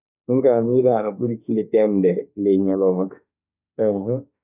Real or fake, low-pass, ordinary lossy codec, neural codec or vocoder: fake; 3.6 kHz; none; autoencoder, 48 kHz, 32 numbers a frame, DAC-VAE, trained on Japanese speech